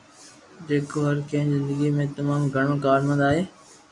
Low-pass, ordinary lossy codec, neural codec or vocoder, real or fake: 10.8 kHz; MP3, 96 kbps; none; real